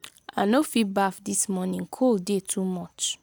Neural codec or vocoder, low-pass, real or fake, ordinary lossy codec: none; none; real; none